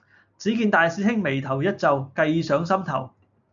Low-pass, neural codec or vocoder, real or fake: 7.2 kHz; none; real